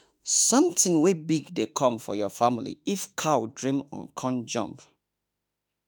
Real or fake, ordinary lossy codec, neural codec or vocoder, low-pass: fake; none; autoencoder, 48 kHz, 32 numbers a frame, DAC-VAE, trained on Japanese speech; none